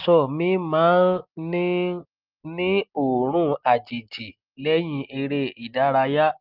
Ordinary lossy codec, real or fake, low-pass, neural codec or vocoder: Opus, 24 kbps; real; 5.4 kHz; none